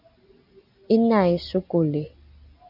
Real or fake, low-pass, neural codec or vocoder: real; 5.4 kHz; none